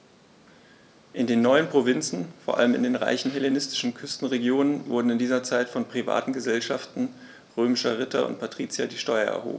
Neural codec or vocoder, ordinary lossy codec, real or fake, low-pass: none; none; real; none